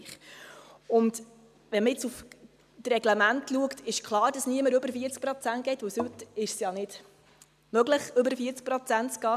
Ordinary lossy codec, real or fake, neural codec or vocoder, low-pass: none; real; none; 14.4 kHz